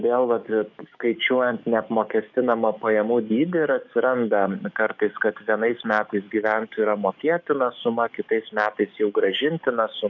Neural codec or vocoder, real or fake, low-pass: codec, 44.1 kHz, 7.8 kbps, DAC; fake; 7.2 kHz